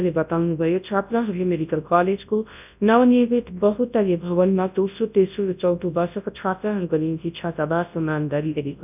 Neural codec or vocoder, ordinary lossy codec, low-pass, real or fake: codec, 24 kHz, 0.9 kbps, WavTokenizer, large speech release; none; 3.6 kHz; fake